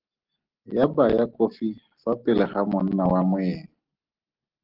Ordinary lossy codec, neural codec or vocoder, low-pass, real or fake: Opus, 16 kbps; none; 5.4 kHz; real